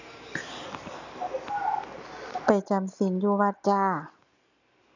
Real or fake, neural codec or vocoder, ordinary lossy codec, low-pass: real; none; none; 7.2 kHz